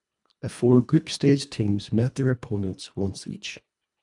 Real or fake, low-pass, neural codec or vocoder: fake; 10.8 kHz; codec, 24 kHz, 1.5 kbps, HILCodec